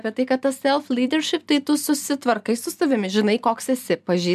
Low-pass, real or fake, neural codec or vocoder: 14.4 kHz; real; none